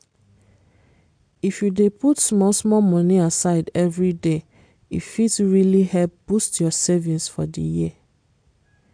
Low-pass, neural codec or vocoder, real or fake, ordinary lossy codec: 9.9 kHz; none; real; MP3, 64 kbps